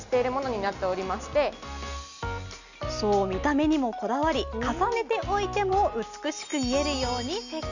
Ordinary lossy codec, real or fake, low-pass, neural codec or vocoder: none; real; 7.2 kHz; none